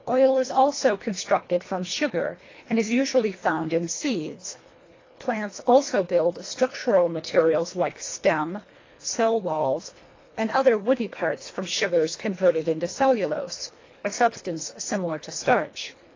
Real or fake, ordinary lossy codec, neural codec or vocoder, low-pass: fake; AAC, 32 kbps; codec, 24 kHz, 1.5 kbps, HILCodec; 7.2 kHz